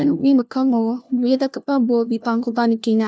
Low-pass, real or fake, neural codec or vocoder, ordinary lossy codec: none; fake; codec, 16 kHz, 1 kbps, FunCodec, trained on LibriTTS, 50 frames a second; none